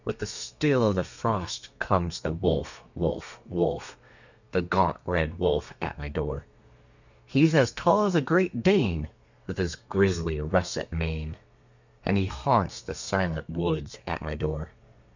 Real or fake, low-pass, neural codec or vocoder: fake; 7.2 kHz; codec, 32 kHz, 1.9 kbps, SNAC